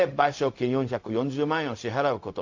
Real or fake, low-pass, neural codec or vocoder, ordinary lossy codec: fake; 7.2 kHz; codec, 16 kHz, 0.4 kbps, LongCat-Audio-Codec; AAC, 48 kbps